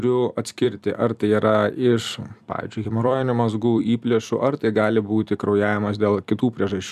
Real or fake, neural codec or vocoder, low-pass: fake; vocoder, 44.1 kHz, 128 mel bands every 256 samples, BigVGAN v2; 14.4 kHz